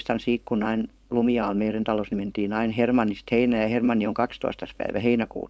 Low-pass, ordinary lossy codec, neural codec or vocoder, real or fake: none; none; codec, 16 kHz, 4.8 kbps, FACodec; fake